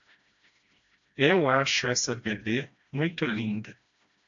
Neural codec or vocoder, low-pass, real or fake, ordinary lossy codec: codec, 16 kHz, 1 kbps, FreqCodec, smaller model; 7.2 kHz; fake; AAC, 64 kbps